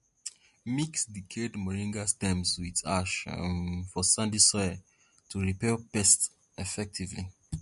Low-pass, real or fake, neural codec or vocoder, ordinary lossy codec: 14.4 kHz; real; none; MP3, 48 kbps